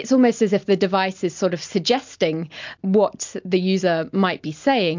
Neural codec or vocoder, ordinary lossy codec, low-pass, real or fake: none; MP3, 64 kbps; 7.2 kHz; real